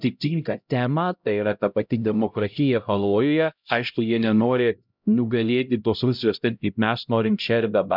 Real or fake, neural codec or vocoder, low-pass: fake; codec, 16 kHz, 0.5 kbps, X-Codec, HuBERT features, trained on LibriSpeech; 5.4 kHz